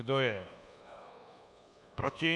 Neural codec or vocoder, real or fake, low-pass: codec, 24 kHz, 1.2 kbps, DualCodec; fake; 10.8 kHz